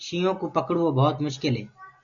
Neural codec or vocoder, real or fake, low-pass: none; real; 7.2 kHz